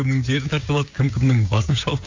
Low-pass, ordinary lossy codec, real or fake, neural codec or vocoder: 7.2 kHz; AAC, 48 kbps; fake; codec, 16 kHz, 6 kbps, DAC